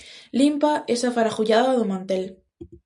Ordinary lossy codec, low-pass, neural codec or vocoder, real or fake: MP3, 96 kbps; 10.8 kHz; none; real